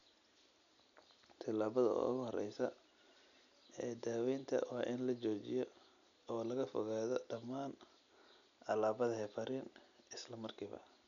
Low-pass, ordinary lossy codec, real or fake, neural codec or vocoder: 7.2 kHz; none; real; none